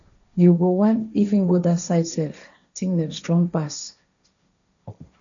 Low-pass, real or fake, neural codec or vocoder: 7.2 kHz; fake; codec, 16 kHz, 1.1 kbps, Voila-Tokenizer